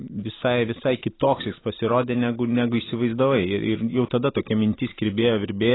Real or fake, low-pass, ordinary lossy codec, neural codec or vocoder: real; 7.2 kHz; AAC, 16 kbps; none